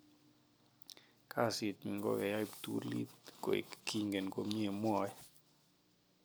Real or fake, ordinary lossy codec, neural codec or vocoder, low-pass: real; none; none; none